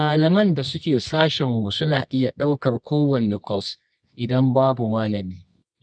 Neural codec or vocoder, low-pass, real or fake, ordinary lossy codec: codec, 24 kHz, 0.9 kbps, WavTokenizer, medium music audio release; 9.9 kHz; fake; none